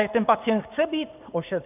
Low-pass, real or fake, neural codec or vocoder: 3.6 kHz; fake; codec, 16 kHz, 6 kbps, DAC